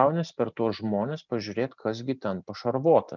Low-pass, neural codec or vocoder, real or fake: 7.2 kHz; none; real